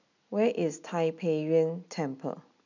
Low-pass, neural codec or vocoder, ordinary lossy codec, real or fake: 7.2 kHz; none; none; real